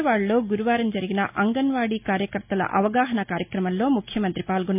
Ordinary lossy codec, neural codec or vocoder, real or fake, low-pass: MP3, 32 kbps; none; real; 3.6 kHz